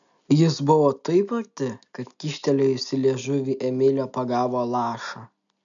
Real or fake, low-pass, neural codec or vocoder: real; 7.2 kHz; none